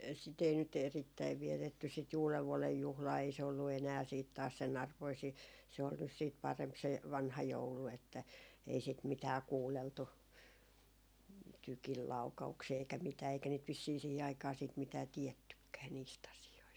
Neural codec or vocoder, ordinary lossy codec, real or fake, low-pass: none; none; real; none